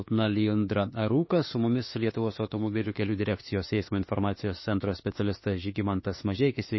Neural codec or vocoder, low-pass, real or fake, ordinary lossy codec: autoencoder, 48 kHz, 32 numbers a frame, DAC-VAE, trained on Japanese speech; 7.2 kHz; fake; MP3, 24 kbps